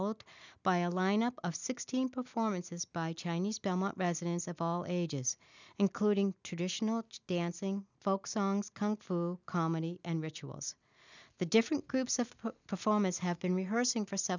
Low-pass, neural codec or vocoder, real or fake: 7.2 kHz; none; real